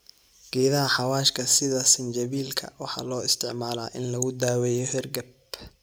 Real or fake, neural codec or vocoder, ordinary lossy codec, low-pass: real; none; none; none